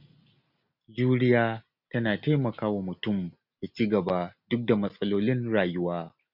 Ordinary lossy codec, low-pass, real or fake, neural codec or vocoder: none; 5.4 kHz; real; none